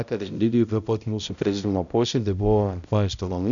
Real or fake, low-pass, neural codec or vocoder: fake; 7.2 kHz; codec, 16 kHz, 0.5 kbps, X-Codec, HuBERT features, trained on balanced general audio